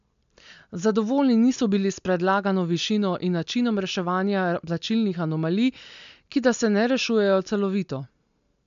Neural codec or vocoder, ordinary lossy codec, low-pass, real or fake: none; MP3, 64 kbps; 7.2 kHz; real